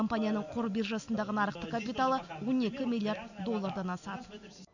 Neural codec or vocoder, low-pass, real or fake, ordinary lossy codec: none; 7.2 kHz; real; none